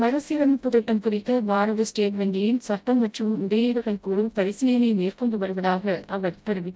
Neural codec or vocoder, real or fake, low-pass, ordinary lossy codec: codec, 16 kHz, 0.5 kbps, FreqCodec, smaller model; fake; none; none